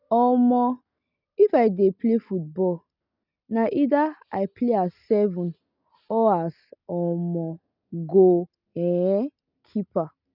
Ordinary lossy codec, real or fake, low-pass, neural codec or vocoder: none; real; 5.4 kHz; none